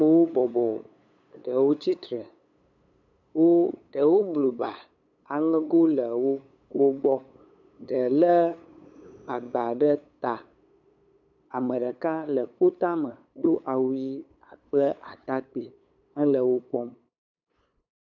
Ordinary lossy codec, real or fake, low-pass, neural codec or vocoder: MP3, 64 kbps; fake; 7.2 kHz; codec, 16 kHz, 8 kbps, FunCodec, trained on LibriTTS, 25 frames a second